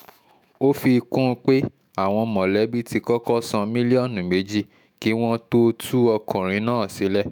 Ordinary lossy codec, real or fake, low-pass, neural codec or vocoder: none; fake; none; autoencoder, 48 kHz, 128 numbers a frame, DAC-VAE, trained on Japanese speech